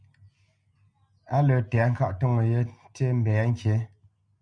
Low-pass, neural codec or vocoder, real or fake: 9.9 kHz; none; real